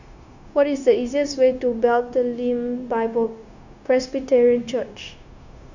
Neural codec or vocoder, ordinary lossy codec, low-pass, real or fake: codec, 16 kHz, 0.9 kbps, LongCat-Audio-Codec; none; 7.2 kHz; fake